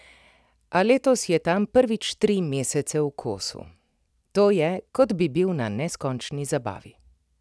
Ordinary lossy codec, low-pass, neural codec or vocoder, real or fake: none; none; none; real